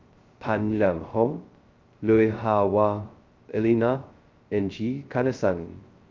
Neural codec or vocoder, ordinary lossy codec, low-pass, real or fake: codec, 16 kHz, 0.2 kbps, FocalCodec; Opus, 32 kbps; 7.2 kHz; fake